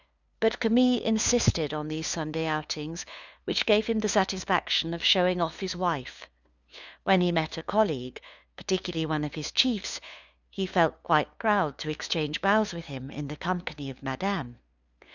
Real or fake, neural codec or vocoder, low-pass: fake; codec, 16 kHz, 2 kbps, FunCodec, trained on LibriTTS, 25 frames a second; 7.2 kHz